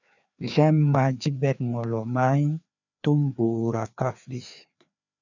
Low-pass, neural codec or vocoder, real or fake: 7.2 kHz; codec, 16 kHz, 2 kbps, FreqCodec, larger model; fake